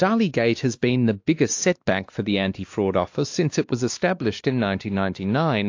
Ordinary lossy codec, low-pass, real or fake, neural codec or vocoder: AAC, 48 kbps; 7.2 kHz; fake; codec, 16 kHz, 2 kbps, X-Codec, WavLM features, trained on Multilingual LibriSpeech